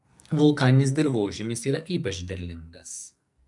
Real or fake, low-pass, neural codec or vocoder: fake; 10.8 kHz; codec, 44.1 kHz, 2.6 kbps, SNAC